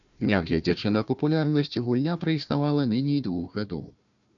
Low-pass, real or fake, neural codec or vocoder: 7.2 kHz; fake; codec, 16 kHz, 1 kbps, FunCodec, trained on Chinese and English, 50 frames a second